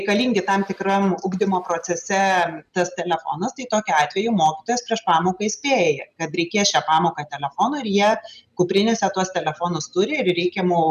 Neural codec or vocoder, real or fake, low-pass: none; real; 14.4 kHz